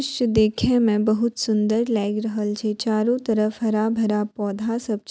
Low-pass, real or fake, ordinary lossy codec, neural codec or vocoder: none; real; none; none